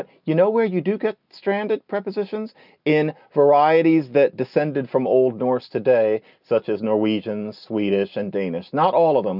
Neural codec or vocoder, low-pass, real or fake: none; 5.4 kHz; real